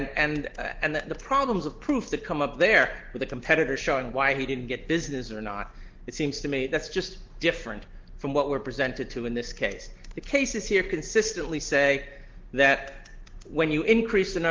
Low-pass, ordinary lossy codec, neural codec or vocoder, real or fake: 7.2 kHz; Opus, 16 kbps; none; real